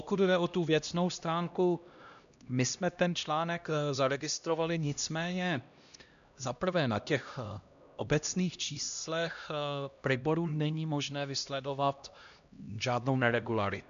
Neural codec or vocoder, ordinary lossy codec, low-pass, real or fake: codec, 16 kHz, 1 kbps, X-Codec, HuBERT features, trained on LibriSpeech; AAC, 96 kbps; 7.2 kHz; fake